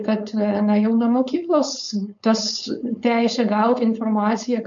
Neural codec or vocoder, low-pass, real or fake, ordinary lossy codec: codec, 16 kHz, 4.8 kbps, FACodec; 7.2 kHz; fake; MP3, 48 kbps